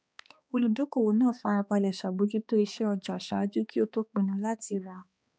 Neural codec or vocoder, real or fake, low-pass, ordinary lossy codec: codec, 16 kHz, 2 kbps, X-Codec, HuBERT features, trained on balanced general audio; fake; none; none